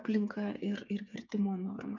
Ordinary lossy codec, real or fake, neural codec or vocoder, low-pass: AAC, 48 kbps; fake; codec, 16 kHz, 8 kbps, FreqCodec, smaller model; 7.2 kHz